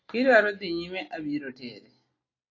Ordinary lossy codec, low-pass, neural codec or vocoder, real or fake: AAC, 32 kbps; 7.2 kHz; none; real